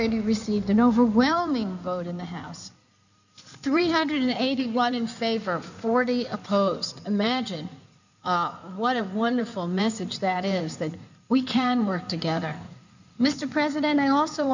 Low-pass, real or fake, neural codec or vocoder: 7.2 kHz; fake; codec, 16 kHz in and 24 kHz out, 2.2 kbps, FireRedTTS-2 codec